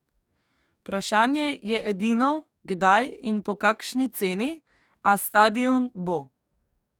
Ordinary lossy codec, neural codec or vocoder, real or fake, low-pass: none; codec, 44.1 kHz, 2.6 kbps, DAC; fake; 19.8 kHz